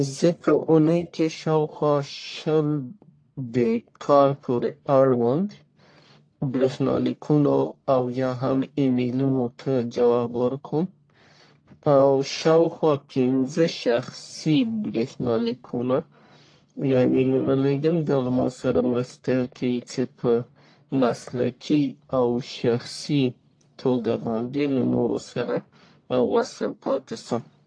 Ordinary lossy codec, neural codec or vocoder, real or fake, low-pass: AAC, 48 kbps; codec, 44.1 kHz, 1.7 kbps, Pupu-Codec; fake; 9.9 kHz